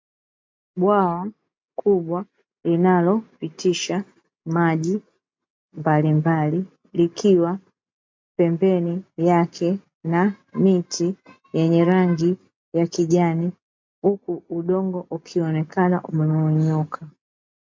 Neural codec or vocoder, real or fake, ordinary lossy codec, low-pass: none; real; MP3, 48 kbps; 7.2 kHz